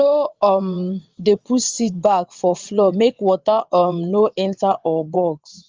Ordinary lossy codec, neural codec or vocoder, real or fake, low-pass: Opus, 32 kbps; vocoder, 22.05 kHz, 80 mel bands, WaveNeXt; fake; 7.2 kHz